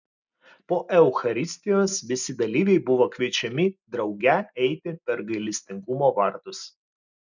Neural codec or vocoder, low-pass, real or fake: none; 7.2 kHz; real